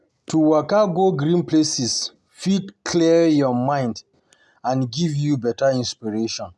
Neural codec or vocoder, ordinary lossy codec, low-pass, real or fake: none; none; none; real